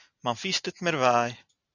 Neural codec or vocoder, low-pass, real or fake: none; 7.2 kHz; real